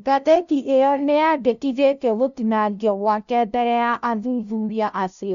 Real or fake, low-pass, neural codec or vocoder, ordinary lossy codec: fake; 7.2 kHz; codec, 16 kHz, 0.5 kbps, FunCodec, trained on LibriTTS, 25 frames a second; none